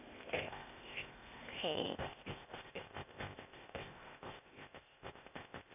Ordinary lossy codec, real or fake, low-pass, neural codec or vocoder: none; fake; 3.6 kHz; codec, 16 kHz, 0.8 kbps, ZipCodec